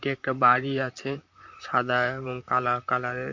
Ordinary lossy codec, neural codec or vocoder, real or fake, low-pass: MP3, 48 kbps; vocoder, 44.1 kHz, 128 mel bands, Pupu-Vocoder; fake; 7.2 kHz